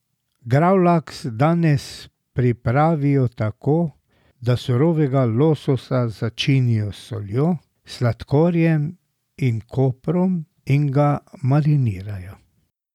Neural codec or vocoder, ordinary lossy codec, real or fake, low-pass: none; none; real; 19.8 kHz